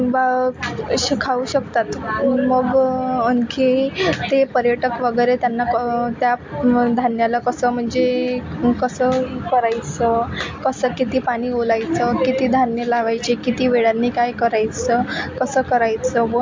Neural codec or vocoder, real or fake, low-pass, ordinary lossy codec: none; real; 7.2 kHz; MP3, 48 kbps